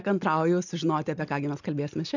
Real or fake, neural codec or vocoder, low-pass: real; none; 7.2 kHz